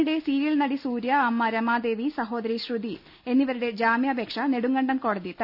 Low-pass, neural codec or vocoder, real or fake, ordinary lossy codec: 5.4 kHz; none; real; none